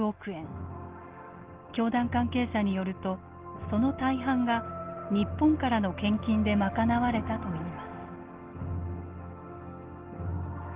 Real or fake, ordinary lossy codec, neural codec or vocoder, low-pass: real; Opus, 16 kbps; none; 3.6 kHz